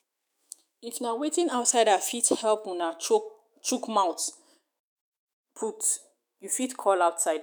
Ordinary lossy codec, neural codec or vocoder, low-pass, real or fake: none; autoencoder, 48 kHz, 128 numbers a frame, DAC-VAE, trained on Japanese speech; none; fake